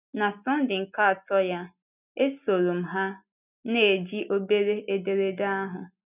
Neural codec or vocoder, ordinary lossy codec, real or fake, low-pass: none; none; real; 3.6 kHz